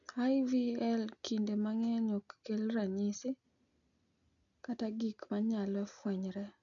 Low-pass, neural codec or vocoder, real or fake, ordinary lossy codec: 7.2 kHz; none; real; none